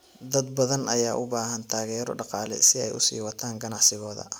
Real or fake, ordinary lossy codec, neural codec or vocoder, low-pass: real; none; none; none